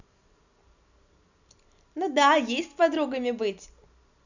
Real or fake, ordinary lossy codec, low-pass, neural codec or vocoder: real; none; 7.2 kHz; none